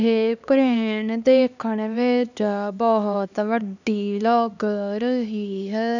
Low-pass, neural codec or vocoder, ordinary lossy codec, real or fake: 7.2 kHz; codec, 16 kHz, 2 kbps, X-Codec, HuBERT features, trained on LibriSpeech; none; fake